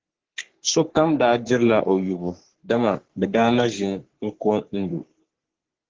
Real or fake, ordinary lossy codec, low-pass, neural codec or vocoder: fake; Opus, 16 kbps; 7.2 kHz; codec, 44.1 kHz, 3.4 kbps, Pupu-Codec